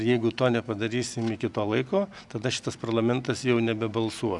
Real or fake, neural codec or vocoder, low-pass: real; none; 10.8 kHz